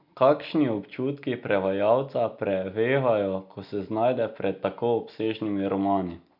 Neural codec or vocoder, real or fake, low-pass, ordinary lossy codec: none; real; 5.4 kHz; none